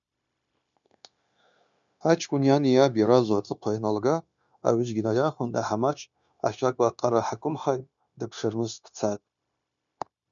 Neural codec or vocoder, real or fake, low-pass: codec, 16 kHz, 0.9 kbps, LongCat-Audio-Codec; fake; 7.2 kHz